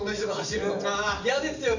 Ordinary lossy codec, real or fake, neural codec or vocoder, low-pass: none; real; none; 7.2 kHz